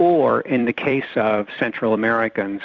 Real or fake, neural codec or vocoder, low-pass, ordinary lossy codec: fake; vocoder, 44.1 kHz, 128 mel bands every 256 samples, BigVGAN v2; 7.2 kHz; Opus, 64 kbps